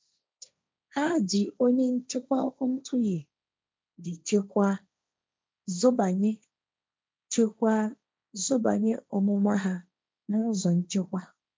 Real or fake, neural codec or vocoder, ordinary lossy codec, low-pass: fake; codec, 16 kHz, 1.1 kbps, Voila-Tokenizer; none; none